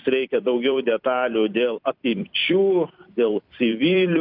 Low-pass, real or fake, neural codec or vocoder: 5.4 kHz; fake; vocoder, 44.1 kHz, 128 mel bands every 256 samples, BigVGAN v2